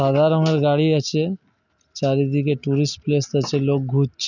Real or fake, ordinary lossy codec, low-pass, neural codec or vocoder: real; none; 7.2 kHz; none